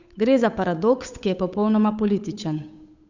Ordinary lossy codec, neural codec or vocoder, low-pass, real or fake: none; codec, 16 kHz, 8 kbps, FunCodec, trained on Chinese and English, 25 frames a second; 7.2 kHz; fake